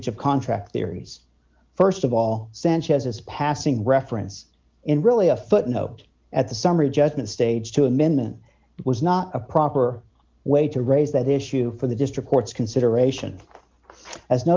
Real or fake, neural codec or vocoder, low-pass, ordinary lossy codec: real; none; 7.2 kHz; Opus, 32 kbps